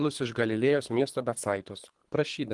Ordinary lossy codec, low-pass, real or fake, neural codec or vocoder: Opus, 24 kbps; 10.8 kHz; fake; codec, 24 kHz, 3 kbps, HILCodec